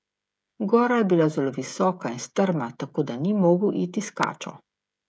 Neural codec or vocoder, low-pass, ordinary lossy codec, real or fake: codec, 16 kHz, 16 kbps, FreqCodec, smaller model; none; none; fake